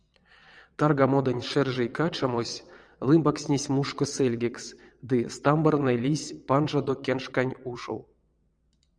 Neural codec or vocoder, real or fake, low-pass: vocoder, 22.05 kHz, 80 mel bands, WaveNeXt; fake; 9.9 kHz